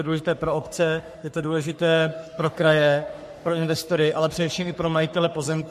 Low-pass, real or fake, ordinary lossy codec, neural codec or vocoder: 14.4 kHz; fake; MP3, 64 kbps; codec, 44.1 kHz, 3.4 kbps, Pupu-Codec